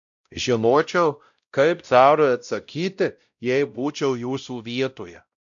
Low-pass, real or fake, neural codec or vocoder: 7.2 kHz; fake; codec, 16 kHz, 0.5 kbps, X-Codec, WavLM features, trained on Multilingual LibriSpeech